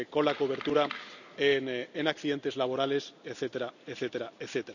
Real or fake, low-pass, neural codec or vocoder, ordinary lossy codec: real; 7.2 kHz; none; none